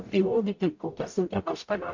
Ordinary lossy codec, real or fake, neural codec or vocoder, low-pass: MP3, 48 kbps; fake; codec, 44.1 kHz, 0.9 kbps, DAC; 7.2 kHz